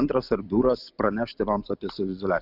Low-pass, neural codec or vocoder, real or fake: 5.4 kHz; none; real